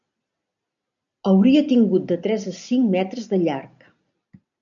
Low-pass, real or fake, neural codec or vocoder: 7.2 kHz; real; none